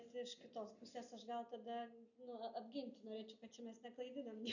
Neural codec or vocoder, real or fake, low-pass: none; real; 7.2 kHz